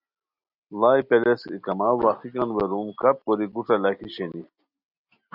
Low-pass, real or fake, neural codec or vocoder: 5.4 kHz; real; none